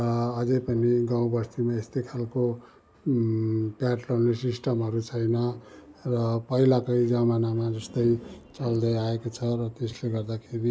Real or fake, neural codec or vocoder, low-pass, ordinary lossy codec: real; none; none; none